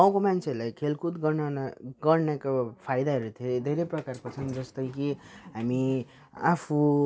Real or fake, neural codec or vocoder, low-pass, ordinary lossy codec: real; none; none; none